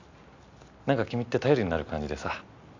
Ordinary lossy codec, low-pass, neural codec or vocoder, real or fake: MP3, 64 kbps; 7.2 kHz; none; real